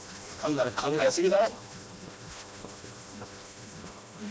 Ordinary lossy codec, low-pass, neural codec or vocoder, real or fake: none; none; codec, 16 kHz, 1 kbps, FreqCodec, smaller model; fake